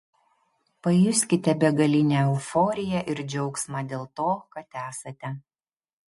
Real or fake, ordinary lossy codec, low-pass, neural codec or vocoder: real; MP3, 48 kbps; 14.4 kHz; none